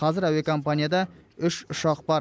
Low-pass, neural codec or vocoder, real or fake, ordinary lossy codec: none; none; real; none